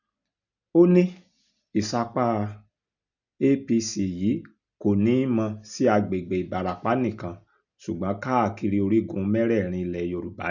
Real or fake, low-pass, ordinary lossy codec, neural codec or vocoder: real; 7.2 kHz; none; none